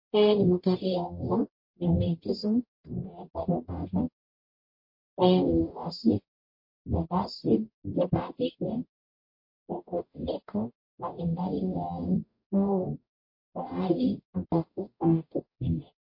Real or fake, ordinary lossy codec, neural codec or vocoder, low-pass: fake; MP3, 32 kbps; codec, 44.1 kHz, 0.9 kbps, DAC; 5.4 kHz